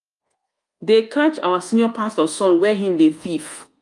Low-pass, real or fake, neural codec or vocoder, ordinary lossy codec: 10.8 kHz; fake; codec, 24 kHz, 1.2 kbps, DualCodec; Opus, 32 kbps